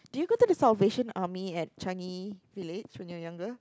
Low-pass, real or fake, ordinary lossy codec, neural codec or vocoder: none; real; none; none